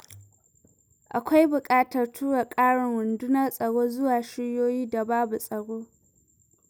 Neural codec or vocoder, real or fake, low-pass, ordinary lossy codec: none; real; none; none